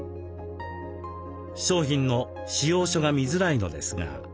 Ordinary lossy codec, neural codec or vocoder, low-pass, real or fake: none; none; none; real